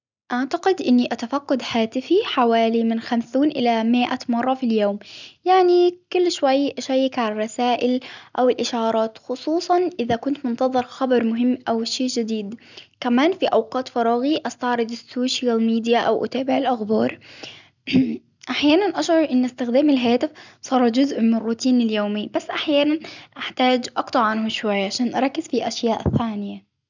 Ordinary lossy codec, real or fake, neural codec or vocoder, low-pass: none; real; none; 7.2 kHz